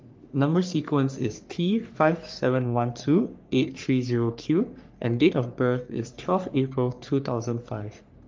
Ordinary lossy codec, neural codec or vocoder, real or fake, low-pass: Opus, 24 kbps; codec, 44.1 kHz, 3.4 kbps, Pupu-Codec; fake; 7.2 kHz